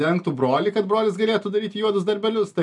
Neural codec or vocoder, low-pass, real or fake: none; 10.8 kHz; real